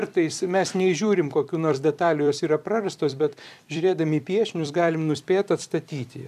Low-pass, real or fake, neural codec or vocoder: 14.4 kHz; fake; vocoder, 44.1 kHz, 128 mel bands every 256 samples, BigVGAN v2